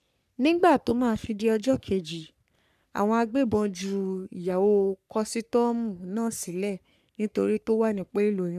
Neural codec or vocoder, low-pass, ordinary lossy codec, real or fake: codec, 44.1 kHz, 3.4 kbps, Pupu-Codec; 14.4 kHz; none; fake